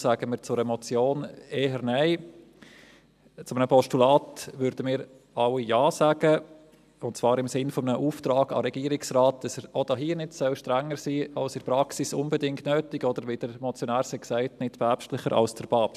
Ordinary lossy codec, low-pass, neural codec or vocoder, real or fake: none; 14.4 kHz; none; real